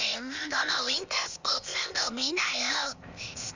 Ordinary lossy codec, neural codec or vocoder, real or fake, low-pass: Opus, 64 kbps; codec, 16 kHz, 0.8 kbps, ZipCodec; fake; 7.2 kHz